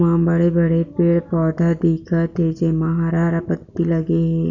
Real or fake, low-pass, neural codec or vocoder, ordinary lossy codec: real; 7.2 kHz; none; none